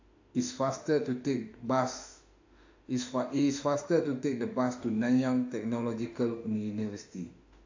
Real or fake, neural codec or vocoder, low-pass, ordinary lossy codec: fake; autoencoder, 48 kHz, 32 numbers a frame, DAC-VAE, trained on Japanese speech; 7.2 kHz; AAC, 48 kbps